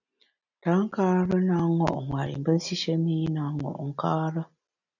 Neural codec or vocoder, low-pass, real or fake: none; 7.2 kHz; real